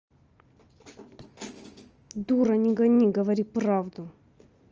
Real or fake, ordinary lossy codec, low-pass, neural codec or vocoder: real; Opus, 24 kbps; 7.2 kHz; none